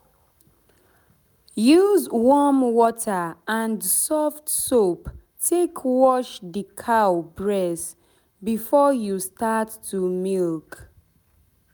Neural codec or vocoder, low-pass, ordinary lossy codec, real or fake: none; none; none; real